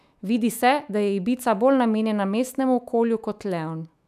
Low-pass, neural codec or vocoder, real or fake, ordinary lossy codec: 14.4 kHz; autoencoder, 48 kHz, 128 numbers a frame, DAC-VAE, trained on Japanese speech; fake; none